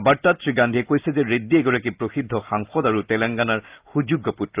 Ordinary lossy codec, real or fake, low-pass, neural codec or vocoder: Opus, 32 kbps; real; 3.6 kHz; none